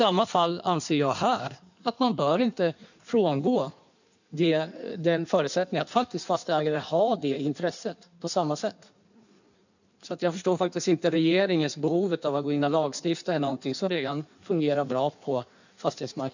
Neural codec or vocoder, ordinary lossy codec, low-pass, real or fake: codec, 16 kHz in and 24 kHz out, 1.1 kbps, FireRedTTS-2 codec; none; 7.2 kHz; fake